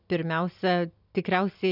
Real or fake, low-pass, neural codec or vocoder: real; 5.4 kHz; none